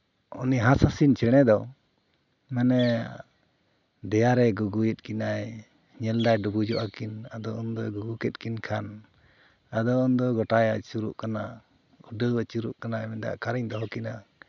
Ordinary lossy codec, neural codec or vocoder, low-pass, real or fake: none; none; 7.2 kHz; real